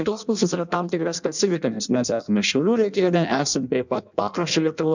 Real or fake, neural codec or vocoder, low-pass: fake; codec, 16 kHz in and 24 kHz out, 0.6 kbps, FireRedTTS-2 codec; 7.2 kHz